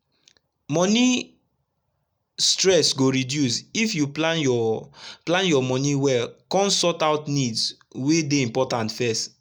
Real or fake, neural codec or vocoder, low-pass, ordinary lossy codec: real; none; none; none